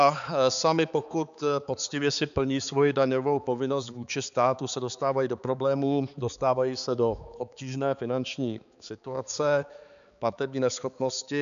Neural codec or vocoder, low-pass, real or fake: codec, 16 kHz, 4 kbps, X-Codec, HuBERT features, trained on balanced general audio; 7.2 kHz; fake